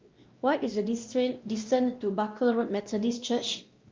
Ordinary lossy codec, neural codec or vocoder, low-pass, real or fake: Opus, 24 kbps; codec, 16 kHz, 1 kbps, X-Codec, WavLM features, trained on Multilingual LibriSpeech; 7.2 kHz; fake